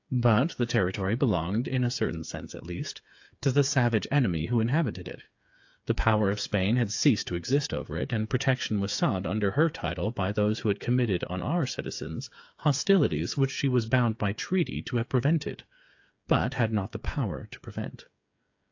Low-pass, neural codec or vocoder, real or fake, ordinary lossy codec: 7.2 kHz; codec, 16 kHz, 8 kbps, FreqCodec, smaller model; fake; AAC, 48 kbps